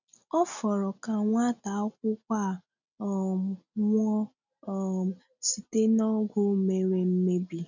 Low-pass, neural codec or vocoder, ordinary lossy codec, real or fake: 7.2 kHz; none; none; real